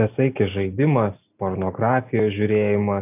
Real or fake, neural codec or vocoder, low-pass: real; none; 3.6 kHz